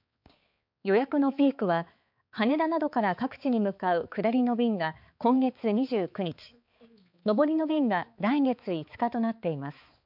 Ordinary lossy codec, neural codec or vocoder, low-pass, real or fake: MP3, 48 kbps; codec, 16 kHz, 4 kbps, X-Codec, HuBERT features, trained on balanced general audio; 5.4 kHz; fake